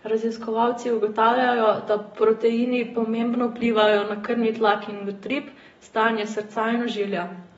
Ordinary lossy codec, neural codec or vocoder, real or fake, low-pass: AAC, 24 kbps; none; real; 19.8 kHz